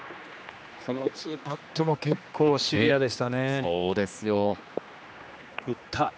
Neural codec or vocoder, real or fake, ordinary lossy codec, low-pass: codec, 16 kHz, 2 kbps, X-Codec, HuBERT features, trained on general audio; fake; none; none